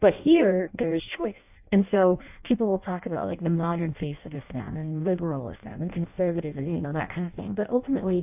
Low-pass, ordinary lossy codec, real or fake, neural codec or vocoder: 3.6 kHz; AAC, 32 kbps; fake; codec, 16 kHz in and 24 kHz out, 0.6 kbps, FireRedTTS-2 codec